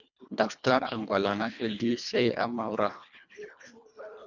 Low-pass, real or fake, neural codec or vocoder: 7.2 kHz; fake; codec, 24 kHz, 1.5 kbps, HILCodec